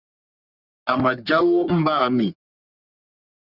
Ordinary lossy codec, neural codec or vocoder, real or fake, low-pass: Opus, 64 kbps; codec, 44.1 kHz, 3.4 kbps, Pupu-Codec; fake; 5.4 kHz